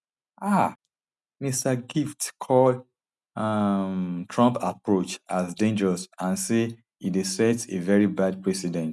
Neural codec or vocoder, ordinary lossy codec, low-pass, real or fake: none; none; none; real